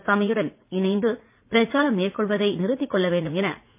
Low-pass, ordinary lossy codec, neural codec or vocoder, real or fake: 3.6 kHz; MP3, 24 kbps; vocoder, 44.1 kHz, 80 mel bands, Vocos; fake